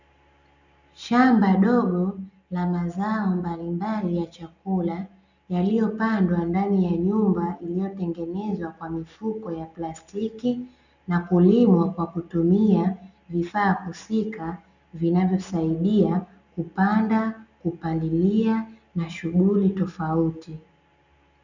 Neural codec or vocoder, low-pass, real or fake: none; 7.2 kHz; real